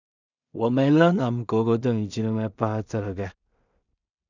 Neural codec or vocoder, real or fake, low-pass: codec, 16 kHz in and 24 kHz out, 0.4 kbps, LongCat-Audio-Codec, two codebook decoder; fake; 7.2 kHz